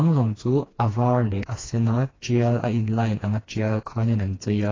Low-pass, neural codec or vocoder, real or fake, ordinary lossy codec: 7.2 kHz; codec, 16 kHz, 2 kbps, FreqCodec, smaller model; fake; AAC, 32 kbps